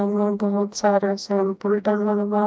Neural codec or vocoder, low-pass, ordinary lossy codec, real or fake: codec, 16 kHz, 1 kbps, FreqCodec, smaller model; none; none; fake